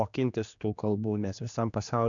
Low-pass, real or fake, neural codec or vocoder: 7.2 kHz; fake; codec, 16 kHz, 2 kbps, X-Codec, HuBERT features, trained on general audio